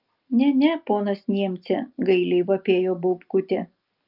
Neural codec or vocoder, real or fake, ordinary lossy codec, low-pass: none; real; Opus, 24 kbps; 5.4 kHz